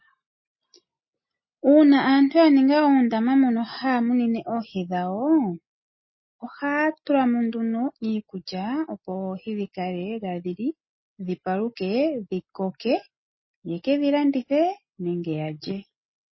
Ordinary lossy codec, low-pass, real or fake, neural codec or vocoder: MP3, 24 kbps; 7.2 kHz; real; none